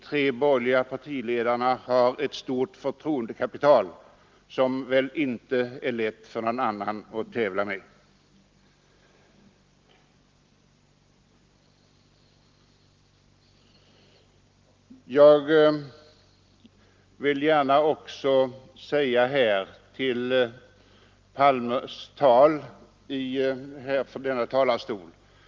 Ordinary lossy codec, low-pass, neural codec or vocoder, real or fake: Opus, 32 kbps; 7.2 kHz; none; real